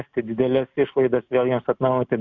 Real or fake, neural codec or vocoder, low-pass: real; none; 7.2 kHz